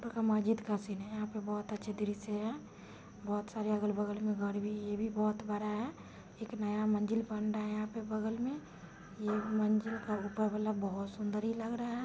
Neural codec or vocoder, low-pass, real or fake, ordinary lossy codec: none; none; real; none